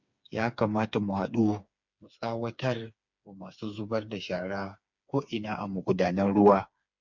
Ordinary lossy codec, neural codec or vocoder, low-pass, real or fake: MP3, 64 kbps; codec, 16 kHz, 4 kbps, FreqCodec, smaller model; 7.2 kHz; fake